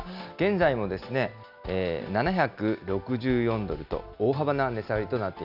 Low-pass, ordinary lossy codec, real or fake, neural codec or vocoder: 5.4 kHz; none; real; none